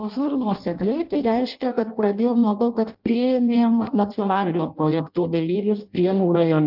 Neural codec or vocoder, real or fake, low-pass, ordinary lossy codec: codec, 16 kHz in and 24 kHz out, 0.6 kbps, FireRedTTS-2 codec; fake; 5.4 kHz; Opus, 24 kbps